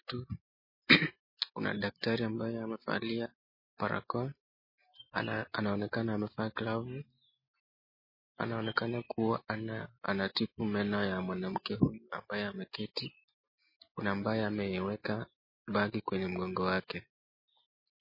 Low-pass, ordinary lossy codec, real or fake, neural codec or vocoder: 5.4 kHz; MP3, 24 kbps; real; none